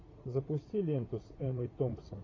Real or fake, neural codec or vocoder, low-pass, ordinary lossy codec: fake; vocoder, 44.1 kHz, 80 mel bands, Vocos; 7.2 kHz; AAC, 32 kbps